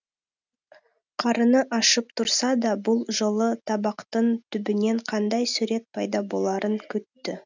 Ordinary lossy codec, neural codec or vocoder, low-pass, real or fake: none; none; 7.2 kHz; real